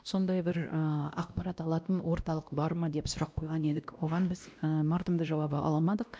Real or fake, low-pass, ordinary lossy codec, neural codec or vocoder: fake; none; none; codec, 16 kHz, 1 kbps, X-Codec, WavLM features, trained on Multilingual LibriSpeech